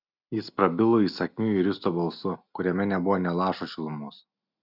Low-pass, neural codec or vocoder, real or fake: 5.4 kHz; none; real